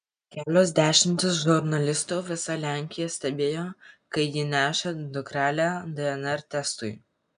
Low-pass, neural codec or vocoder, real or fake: 9.9 kHz; none; real